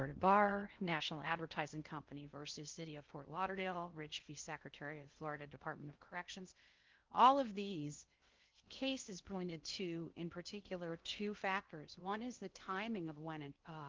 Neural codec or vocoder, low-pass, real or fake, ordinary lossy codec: codec, 16 kHz in and 24 kHz out, 0.6 kbps, FocalCodec, streaming, 4096 codes; 7.2 kHz; fake; Opus, 32 kbps